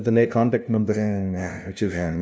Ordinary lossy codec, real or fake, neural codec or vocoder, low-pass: none; fake; codec, 16 kHz, 0.5 kbps, FunCodec, trained on LibriTTS, 25 frames a second; none